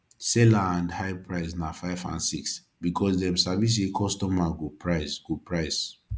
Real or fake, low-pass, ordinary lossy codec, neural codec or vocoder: real; none; none; none